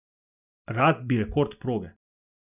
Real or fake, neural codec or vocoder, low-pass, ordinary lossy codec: real; none; 3.6 kHz; none